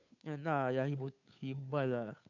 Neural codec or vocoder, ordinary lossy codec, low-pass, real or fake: codec, 16 kHz, 2 kbps, FunCodec, trained on Chinese and English, 25 frames a second; none; 7.2 kHz; fake